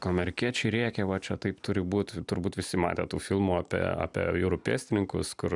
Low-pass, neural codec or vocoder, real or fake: 10.8 kHz; none; real